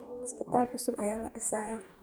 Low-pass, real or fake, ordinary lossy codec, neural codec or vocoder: none; fake; none; codec, 44.1 kHz, 2.6 kbps, DAC